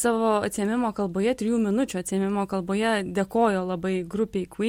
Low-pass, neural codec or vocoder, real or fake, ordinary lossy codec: 19.8 kHz; none; real; MP3, 64 kbps